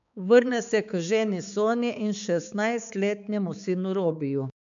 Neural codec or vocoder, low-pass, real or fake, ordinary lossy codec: codec, 16 kHz, 4 kbps, X-Codec, HuBERT features, trained on balanced general audio; 7.2 kHz; fake; none